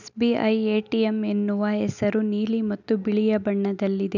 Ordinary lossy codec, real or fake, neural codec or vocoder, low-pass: none; real; none; 7.2 kHz